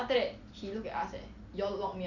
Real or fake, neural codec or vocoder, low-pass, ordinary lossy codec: real; none; 7.2 kHz; none